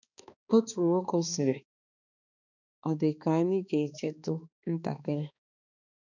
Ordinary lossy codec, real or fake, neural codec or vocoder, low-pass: none; fake; codec, 16 kHz, 2 kbps, X-Codec, HuBERT features, trained on balanced general audio; 7.2 kHz